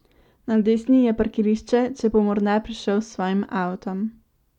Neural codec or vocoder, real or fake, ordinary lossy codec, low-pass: none; real; none; 19.8 kHz